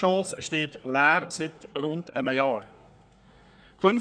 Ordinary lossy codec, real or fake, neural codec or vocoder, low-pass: none; fake; codec, 24 kHz, 1 kbps, SNAC; 9.9 kHz